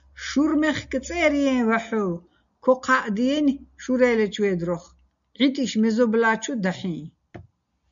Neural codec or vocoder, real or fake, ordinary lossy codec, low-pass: none; real; MP3, 96 kbps; 7.2 kHz